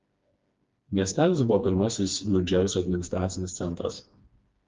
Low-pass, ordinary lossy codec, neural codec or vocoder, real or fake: 7.2 kHz; Opus, 32 kbps; codec, 16 kHz, 2 kbps, FreqCodec, smaller model; fake